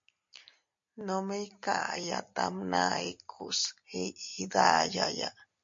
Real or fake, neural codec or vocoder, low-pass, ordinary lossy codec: real; none; 7.2 kHz; MP3, 48 kbps